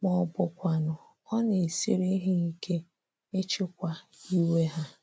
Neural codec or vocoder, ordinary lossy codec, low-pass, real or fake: none; none; none; real